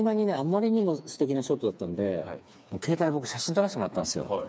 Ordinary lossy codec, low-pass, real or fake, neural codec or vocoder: none; none; fake; codec, 16 kHz, 4 kbps, FreqCodec, smaller model